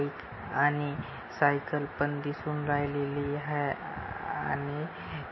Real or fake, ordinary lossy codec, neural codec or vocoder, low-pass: real; MP3, 24 kbps; none; 7.2 kHz